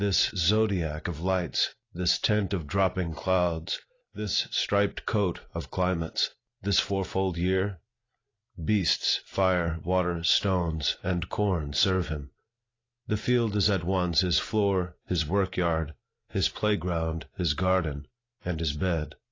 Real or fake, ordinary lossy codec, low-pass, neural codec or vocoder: real; AAC, 32 kbps; 7.2 kHz; none